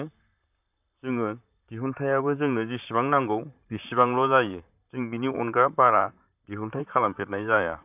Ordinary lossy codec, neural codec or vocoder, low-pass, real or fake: none; vocoder, 44.1 kHz, 128 mel bands, Pupu-Vocoder; 3.6 kHz; fake